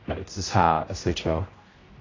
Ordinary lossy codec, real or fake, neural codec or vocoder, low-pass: AAC, 32 kbps; fake; codec, 16 kHz, 0.5 kbps, X-Codec, HuBERT features, trained on general audio; 7.2 kHz